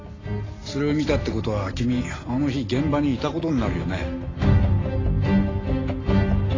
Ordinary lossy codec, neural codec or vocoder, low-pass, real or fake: AAC, 32 kbps; none; 7.2 kHz; real